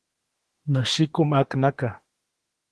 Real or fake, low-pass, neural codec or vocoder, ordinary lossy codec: fake; 10.8 kHz; autoencoder, 48 kHz, 32 numbers a frame, DAC-VAE, trained on Japanese speech; Opus, 16 kbps